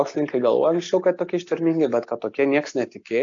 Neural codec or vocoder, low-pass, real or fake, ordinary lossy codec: none; 7.2 kHz; real; AAC, 48 kbps